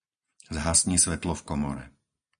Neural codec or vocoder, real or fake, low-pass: none; real; 9.9 kHz